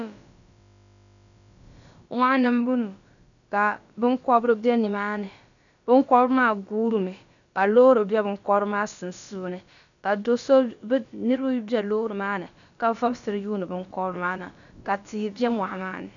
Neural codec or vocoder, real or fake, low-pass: codec, 16 kHz, about 1 kbps, DyCAST, with the encoder's durations; fake; 7.2 kHz